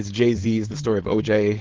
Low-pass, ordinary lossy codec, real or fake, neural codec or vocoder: 7.2 kHz; Opus, 16 kbps; fake; codec, 16 kHz, 4.8 kbps, FACodec